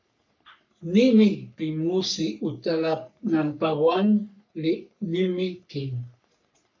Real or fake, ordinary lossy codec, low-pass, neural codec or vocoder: fake; MP3, 64 kbps; 7.2 kHz; codec, 44.1 kHz, 3.4 kbps, Pupu-Codec